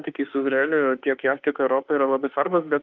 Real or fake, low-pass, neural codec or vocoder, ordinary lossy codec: fake; 7.2 kHz; autoencoder, 48 kHz, 32 numbers a frame, DAC-VAE, trained on Japanese speech; Opus, 32 kbps